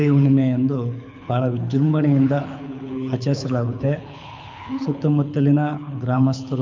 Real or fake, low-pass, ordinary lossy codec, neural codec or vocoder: fake; 7.2 kHz; MP3, 64 kbps; codec, 24 kHz, 6 kbps, HILCodec